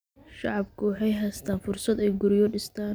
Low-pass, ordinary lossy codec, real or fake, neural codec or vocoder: none; none; real; none